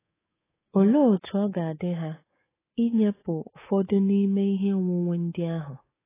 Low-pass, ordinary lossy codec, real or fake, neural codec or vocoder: 3.6 kHz; AAC, 16 kbps; fake; codec, 24 kHz, 3.1 kbps, DualCodec